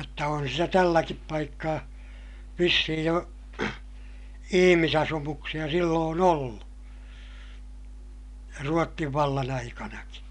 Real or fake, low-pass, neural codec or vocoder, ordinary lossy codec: real; 10.8 kHz; none; none